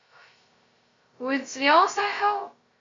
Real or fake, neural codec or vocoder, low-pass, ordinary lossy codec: fake; codec, 16 kHz, 0.2 kbps, FocalCodec; 7.2 kHz; MP3, 48 kbps